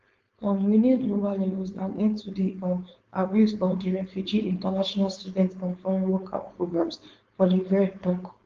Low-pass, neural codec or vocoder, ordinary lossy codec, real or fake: 7.2 kHz; codec, 16 kHz, 4.8 kbps, FACodec; Opus, 32 kbps; fake